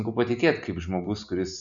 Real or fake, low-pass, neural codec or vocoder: real; 7.2 kHz; none